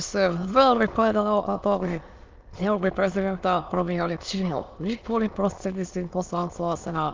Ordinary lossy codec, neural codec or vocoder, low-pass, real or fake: Opus, 32 kbps; autoencoder, 22.05 kHz, a latent of 192 numbers a frame, VITS, trained on many speakers; 7.2 kHz; fake